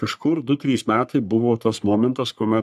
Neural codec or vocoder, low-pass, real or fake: codec, 44.1 kHz, 3.4 kbps, Pupu-Codec; 14.4 kHz; fake